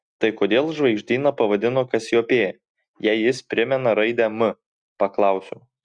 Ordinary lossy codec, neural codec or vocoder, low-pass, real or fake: Opus, 64 kbps; none; 9.9 kHz; real